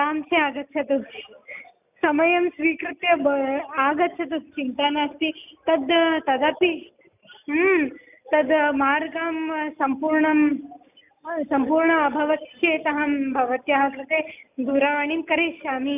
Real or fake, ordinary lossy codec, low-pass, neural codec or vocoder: real; none; 3.6 kHz; none